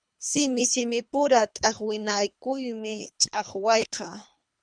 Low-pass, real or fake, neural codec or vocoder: 9.9 kHz; fake; codec, 24 kHz, 3 kbps, HILCodec